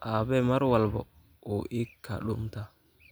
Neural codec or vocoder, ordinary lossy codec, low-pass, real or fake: none; none; none; real